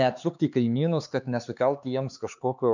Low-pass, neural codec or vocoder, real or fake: 7.2 kHz; codec, 16 kHz, 4 kbps, X-Codec, HuBERT features, trained on LibriSpeech; fake